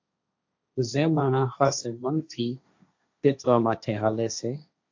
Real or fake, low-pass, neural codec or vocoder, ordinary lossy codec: fake; 7.2 kHz; codec, 16 kHz, 1.1 kbps, Voila-Tokenizer; AAC, 48 kbps